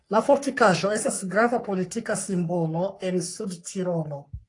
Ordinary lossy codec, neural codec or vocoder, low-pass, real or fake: AAC, 48 kbps; codec, 44.1 kHz, 3.4 kbps, Pupu-Codec; 10.8 kHz; fake